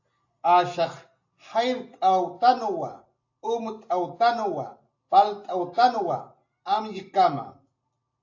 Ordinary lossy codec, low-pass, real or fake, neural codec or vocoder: AAC, 48 kbps; 7.2 kHz; real; none